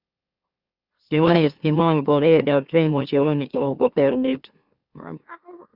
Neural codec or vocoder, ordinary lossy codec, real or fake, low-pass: autoencoder, 44.1 kHz, a latent of 192 numbers a frame, MeloTTS; Opus, 64 kbps; fake; 5.4 kHz